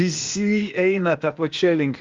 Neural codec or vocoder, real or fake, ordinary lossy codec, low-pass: codec, 16 kHz, 0.8 kbps, ZipCodec; fake; Opus, 32 kbps; 7.2 kHz